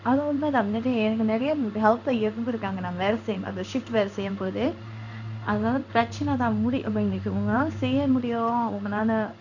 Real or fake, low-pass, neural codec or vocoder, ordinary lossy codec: fake; 7.2 kHz; codec, 16 kHz in and 24 kHz out, 1 kbps, XY-Tokenizer; none